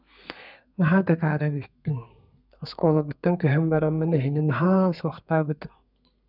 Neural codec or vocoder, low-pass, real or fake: codec, 32 kHz, 1.9 kbps, SNAC; 5.4 kHz; fake